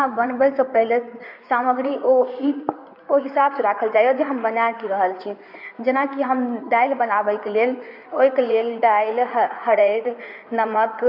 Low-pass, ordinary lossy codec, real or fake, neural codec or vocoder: 5.4 kHz; none; fake; codec, 16 kHz in and 24 kHz out, 2.2 kbps, FireRedTTS-2 codec